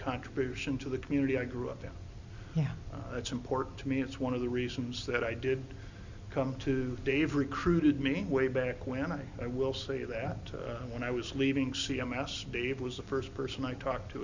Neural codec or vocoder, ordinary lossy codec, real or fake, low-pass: none; Opus, 64 kbps; real; 7.2 kHz